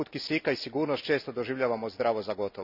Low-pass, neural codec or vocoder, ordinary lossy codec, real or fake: 5.4 kHz; none; none; real